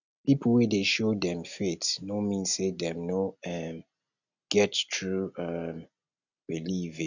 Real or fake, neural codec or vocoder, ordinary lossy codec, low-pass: real; none; none; 7.2 kHz